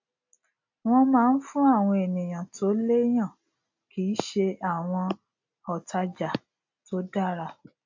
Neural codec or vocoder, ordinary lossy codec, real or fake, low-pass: none; AAC, 48 kbps; real; 7.2 kHz